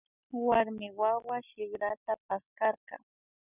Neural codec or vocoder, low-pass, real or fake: none; 3.6 kHz; real